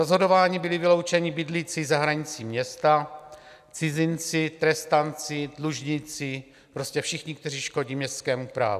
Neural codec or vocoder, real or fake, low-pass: none; real; 14.4 kHz